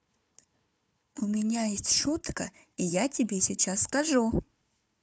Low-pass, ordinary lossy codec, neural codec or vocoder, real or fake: none; none; codec, 16 kHz, 4 kbps, FunCodec, trained on Chinese and English, 50 frames a second; fake